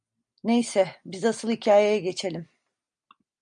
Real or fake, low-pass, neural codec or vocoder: real; 9.9 kHz; none